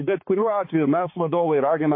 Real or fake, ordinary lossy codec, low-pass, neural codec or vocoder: fake; MP3, 24 kbps; 5.4 kHz; codec, 16 kHz, 4 kbps, X-Codec, HuBERT features, trained on balanced general audio